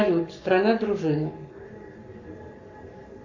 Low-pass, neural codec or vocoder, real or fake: 7.2 kHz; codec, 44.1 kHz, 7.8 kbps, Pupu-Codec; fake